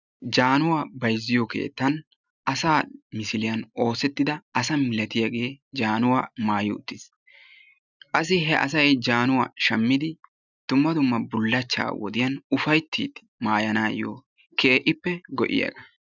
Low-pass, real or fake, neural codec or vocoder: 7.2 kHz; real; none